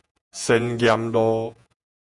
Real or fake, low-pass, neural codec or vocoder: fake; 10.8 kHz; vocoder, 48 kHz, 128 mel bands, Vocos